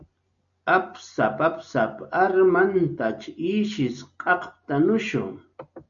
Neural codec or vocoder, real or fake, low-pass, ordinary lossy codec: none; real; 7.2 kHz; MP3, 96 kbps